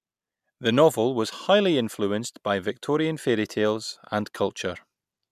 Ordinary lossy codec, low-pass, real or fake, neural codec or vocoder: none; 14.4 kHz; real; none